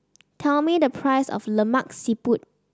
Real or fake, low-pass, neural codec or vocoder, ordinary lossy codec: real; none; none; none